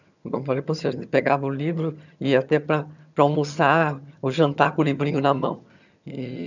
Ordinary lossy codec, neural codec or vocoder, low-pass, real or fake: none; vocoder, 22.05 kHz, 80 mel bands, HiFi-GAN; 7.2 kHz; fake